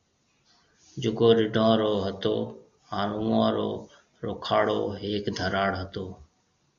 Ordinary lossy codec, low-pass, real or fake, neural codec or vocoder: Opus, 64 kbps; 7.2 kHz; real; none